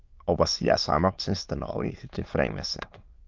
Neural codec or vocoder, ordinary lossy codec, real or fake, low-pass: autoencoder, 22.05 kHz, a latent of 192 numbers a frame, VITS, trained on many speakers; Opus, 24 kbps; fake; 7.2 kHz